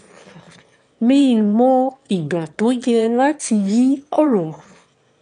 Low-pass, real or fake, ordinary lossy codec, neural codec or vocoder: 9.9 kHz; fake; none; autoencoder, 22.05 kHz, a latent of 192 numbers a frame, VITS, trained on one speaker